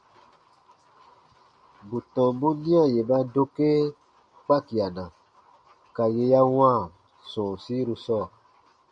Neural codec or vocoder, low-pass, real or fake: none; 9.9 kHz; real